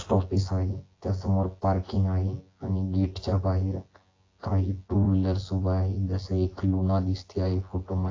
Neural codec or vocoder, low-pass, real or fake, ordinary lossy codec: vocoder, 24 kHz, 100 mel bands, Vocos; 7.2 kHz; fake; AAC, 32 kbps